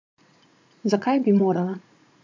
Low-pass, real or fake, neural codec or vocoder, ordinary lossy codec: 7.2 kHz; fake; vocoder, 44.1 kHz, 80 mel bands, Vocos; MP3, 64 kbps